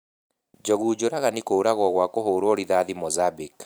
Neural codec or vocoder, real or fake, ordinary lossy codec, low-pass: vocoder, 44.1 kHz, 128 mel bands every 512 samples, BigVGAN v2; fake; none; none